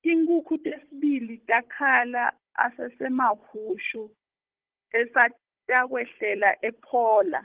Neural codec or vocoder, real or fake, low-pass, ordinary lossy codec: codec, 16 kHz, 16 kbps, FunCodec, trained on Chinese and English, 50 frames a second; fake; 3.6 kHz; Opus, 16 kbps